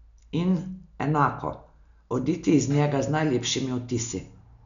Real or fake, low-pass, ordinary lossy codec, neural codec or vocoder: real; 7.2 kHz; none; none